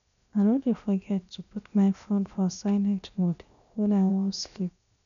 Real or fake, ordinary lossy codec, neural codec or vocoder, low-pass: fake; none; codec, 16 kHz, 0.7 kbps, FocalCodec; 7.2 kHz